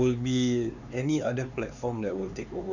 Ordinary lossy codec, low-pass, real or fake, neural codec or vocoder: none; 7.2 kHz; fake; codec, 16 kHz, 4 kbps, X-Codec, HuBERT features, trained on LibriSpeech